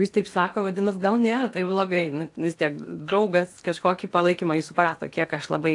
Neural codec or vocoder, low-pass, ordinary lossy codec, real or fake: codec, 16 kHz in and 24 kHz out, 0.8 kbps, FocalCodec, streaming, 65536 codes; 10.8 kHz; AAC, 64 kbps; fake